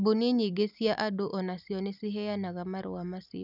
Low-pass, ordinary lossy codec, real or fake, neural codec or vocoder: 5.4 kHz; none; real; none